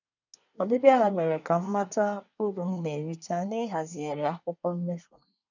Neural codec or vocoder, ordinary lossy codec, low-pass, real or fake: codec, 24 kHz, 1 kbps, SNAC; none; 7.2 kHz; fake